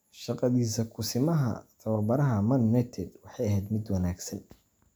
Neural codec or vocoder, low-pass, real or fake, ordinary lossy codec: none; none; real; none